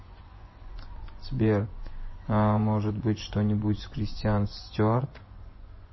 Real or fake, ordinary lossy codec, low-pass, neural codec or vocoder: fake; MP3, 24 kbps; 7.2 kHz; vocoder, 24 kHz, 100 mel bands, Vocos